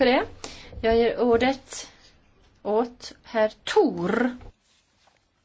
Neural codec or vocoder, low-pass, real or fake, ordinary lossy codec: none; none; real; none